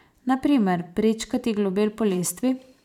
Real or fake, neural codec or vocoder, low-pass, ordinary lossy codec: fake; vocoder, 44.1 kHz, 128 mel bands every 512 samples, BigVGAN v2; 19.8 kHz; none